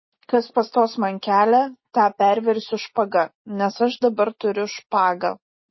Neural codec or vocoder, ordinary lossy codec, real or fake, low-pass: none; MP3, 24 kbps; real; 7.2 kHz